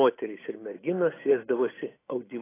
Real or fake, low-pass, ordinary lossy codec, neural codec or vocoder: real; 3.6 kHz; AAC, 16 kbps; none